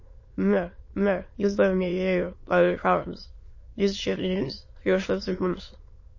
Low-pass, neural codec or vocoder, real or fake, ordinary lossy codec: 7.2 kHz; autoencoder, 22.05 kHz, a latent of 192 numbers a frame, VITS, trained on many speakers; fake; MP3, 32 kbps